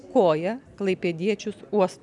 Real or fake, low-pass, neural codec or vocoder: real; 10.8 kHz; none